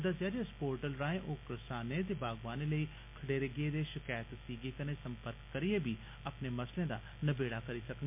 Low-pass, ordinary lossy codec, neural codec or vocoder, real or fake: 3.6 kHz; MP3, 32 kbps; none; real